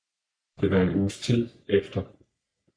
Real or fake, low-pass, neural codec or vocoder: fake; 9.9 kHz; codec, 44.1 kHz, 3.4 kbps, Pupu-Codec